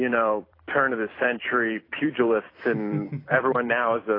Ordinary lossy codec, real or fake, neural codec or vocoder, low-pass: AAC, 24 kbps; fake; vocoder, 44.1 kHz, 128 mel bands every 256 samples, BigVGAN v2; 5.4 kHz